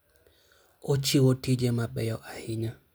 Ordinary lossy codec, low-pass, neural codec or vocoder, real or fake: none; none; none; real